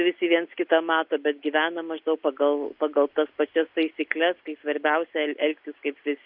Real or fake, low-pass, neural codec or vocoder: real; 5.4 kHz; none